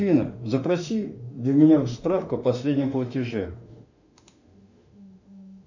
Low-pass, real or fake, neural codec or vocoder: 7.2 kHz; fake; autoencoder, 48 kHz, 32 numbers a frame, DAC-VAE, trained on Japanese speech